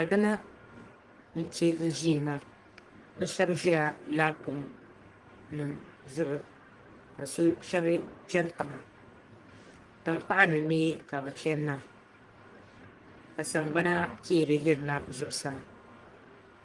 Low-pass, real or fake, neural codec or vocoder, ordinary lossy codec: 10.8 kHz; fake; codec, 44.1 kHz, 1.7 kbps, Pupu-Codec; Opus, 24 kbps